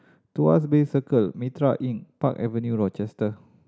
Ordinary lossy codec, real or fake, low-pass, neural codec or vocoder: none; real; none; none